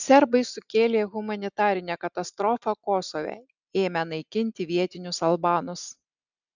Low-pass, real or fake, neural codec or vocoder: 7.2 kHz; real; none